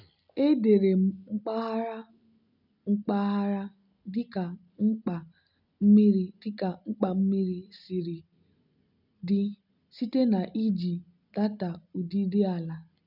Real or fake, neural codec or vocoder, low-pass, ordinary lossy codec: real; none; 5.4 kHz; none